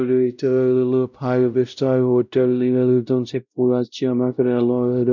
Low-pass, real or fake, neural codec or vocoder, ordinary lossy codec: 7.2 kHz; fake; codec, 16 kHz, 0.5 kbps, X-Codec, WavLM features, trained on Multilingual LibriSpeech; none